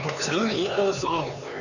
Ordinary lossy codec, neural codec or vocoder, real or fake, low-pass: none; codec, 16 kHz, 4 kbps, X-Codec, HuBERT features, trained on LibriSpeech; fake; 7.2 kHz